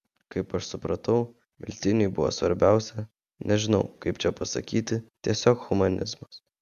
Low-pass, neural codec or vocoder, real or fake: 14.4 kHz; none; real